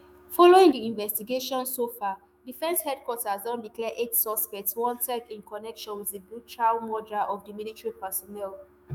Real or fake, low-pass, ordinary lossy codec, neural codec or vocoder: fake; none; none; autoencoder, 48 kHz, 128 numbers a frame, DAC-VAE, trained on Japanese speech